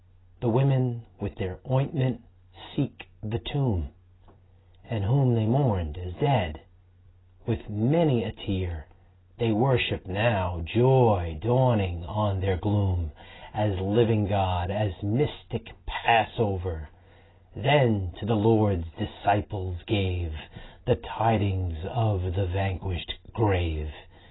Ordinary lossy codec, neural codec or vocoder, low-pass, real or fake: AAC, 16 kbps; none; 7.2 kHz; real